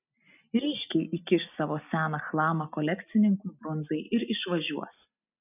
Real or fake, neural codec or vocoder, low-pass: real; none; 3.6 kHz